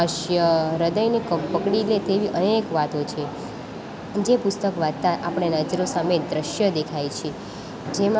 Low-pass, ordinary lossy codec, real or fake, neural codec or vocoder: none; none; real; none